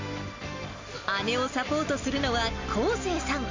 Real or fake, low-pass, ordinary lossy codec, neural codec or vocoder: real; 7.2 kHz; MP3, 64 kbps; none